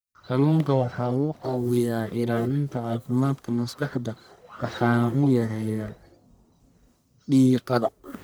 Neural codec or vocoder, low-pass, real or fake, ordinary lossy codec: codec, 44.1 kHz, 1.7 kbps, Pupu-Codec; none; fake; none